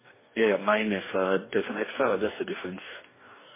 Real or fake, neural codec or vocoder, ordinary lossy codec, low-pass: fake; codec, 44.1 kHz, 2.6 kbps, DAC; MP3, 16 kbps; 3.6 kHz